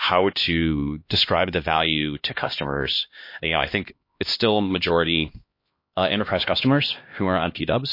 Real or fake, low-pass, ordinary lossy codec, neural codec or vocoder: fake; 5.4 kHz; MP3, 32 kbps; codec, 16 kHz, 1 kbps, X-Codec, HuBERT features, trained on LibriSpeech